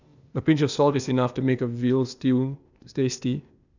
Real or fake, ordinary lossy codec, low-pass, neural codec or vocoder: fake; none; 7.2 kHz; codec, 16 kHz, 0.8 kbps, ZipCodec